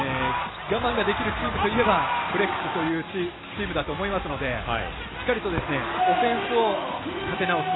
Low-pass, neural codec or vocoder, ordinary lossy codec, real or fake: 7.2 kHz; none; AAC, 16 kbps; real